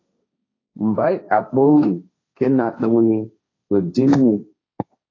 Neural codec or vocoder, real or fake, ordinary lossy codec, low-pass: codec, 16 kHz, 1.1 kbps, Voila-Tokenizer; fake; AAC, 32 kbps; 7.2 kHz